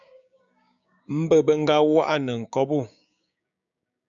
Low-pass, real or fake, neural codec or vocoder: 7.2 kHz; fake; codec, 16 kHz, 6 kbps, DAC